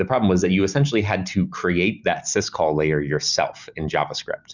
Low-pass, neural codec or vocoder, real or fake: 7.2 kHz; none; real